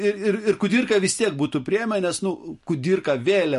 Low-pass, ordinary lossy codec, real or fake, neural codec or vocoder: 14.4 kHz; MP3, 48 kbps; real; none